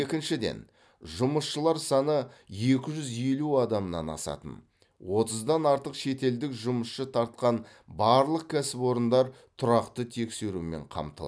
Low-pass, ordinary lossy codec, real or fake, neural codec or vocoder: none; none; real; none